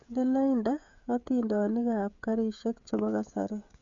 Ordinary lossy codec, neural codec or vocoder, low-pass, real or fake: MP3, 64 kbps; codec, 16 kHz, 16 kbps, FreqCodec, smaller model; 7.2 kHz; fake